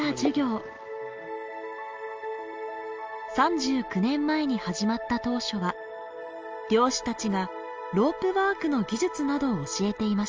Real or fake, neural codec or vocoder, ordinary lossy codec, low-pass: real; none; Opus, 24 kbps; 7.2 kHz